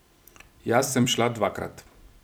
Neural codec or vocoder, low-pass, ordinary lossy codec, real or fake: vocoder, 44.1 kHz, 128 mel bands every 256 samples, BigVGAN v2; none; none; fake